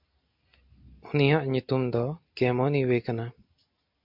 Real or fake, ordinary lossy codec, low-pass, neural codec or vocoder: real; MP3, 48 kbps; 5.4 kHz; none